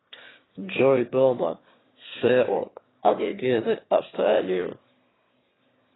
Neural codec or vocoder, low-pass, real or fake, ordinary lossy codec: autoencoder, 22.05 kHz, a latent of 192 numbers a frame, VITS, trained on one speaker; 7.2 kHz; fake; AAC, 16 kbps